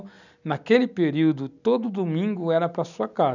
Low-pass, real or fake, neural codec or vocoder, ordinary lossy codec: 7.2 kHz; real; none; none